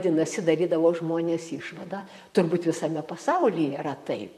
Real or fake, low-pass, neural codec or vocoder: fake; 14.4 kHz; vocoder, 44.1 kHz, 128 mel bands, Pupu-Vocoder